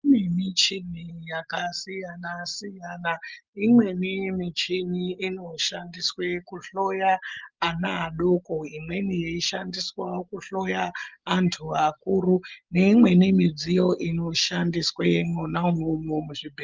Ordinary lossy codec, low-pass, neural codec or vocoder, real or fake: Opus, 32 kbps; 7.2 kHz; none; real